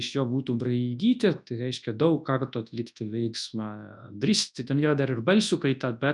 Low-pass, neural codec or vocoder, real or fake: 10.8 kHz; codec, 24 kHz, 0.9 kbps, WavTokenizer, large speech release; fake